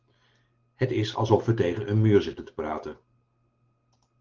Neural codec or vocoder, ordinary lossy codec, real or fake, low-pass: none; Opus, 16 kbps; real; 7.2 kHz